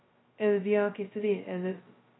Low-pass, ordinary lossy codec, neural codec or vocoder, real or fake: 7.2 kHz; AAC, 16 kbps; codec, 16 kHz, 0.2 kbps, FocalCodec; fake